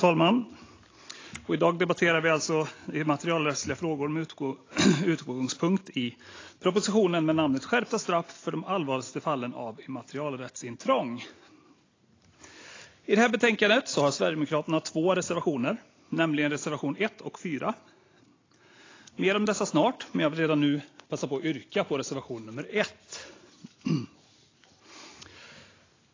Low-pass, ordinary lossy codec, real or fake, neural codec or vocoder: 7.2 kHz; AAC, 32 kbps; real; none